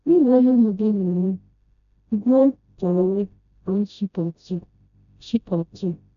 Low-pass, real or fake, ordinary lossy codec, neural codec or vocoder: 7.2 kHz; fake; none; codec, 16 kHz, 0.5 kbps, FreqCodec, smaller model